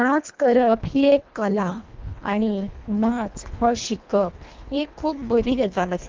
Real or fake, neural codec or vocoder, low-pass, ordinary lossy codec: fake; codec, 24 kHz, 1.5 kbps, HILCodec; 7.2 kHz; Opus, 32 kbps